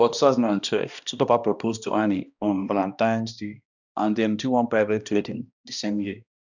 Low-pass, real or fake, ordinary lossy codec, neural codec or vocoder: 7.2 kHz; fake; none; codec, 16 kHz, 1 kbps, X-Codec, HuBERT features, trained on balanced general audio